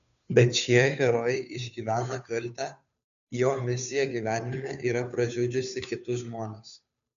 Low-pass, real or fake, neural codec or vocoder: 7.2 kHz; fake; codec, 16 kHz, 2 kbps, FunCodec, trained on Chinese and English, 25 frames a second